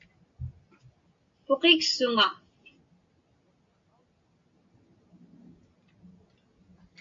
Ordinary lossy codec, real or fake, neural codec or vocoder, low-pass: MP3, 48 kbps; real; none; 7.2 kHz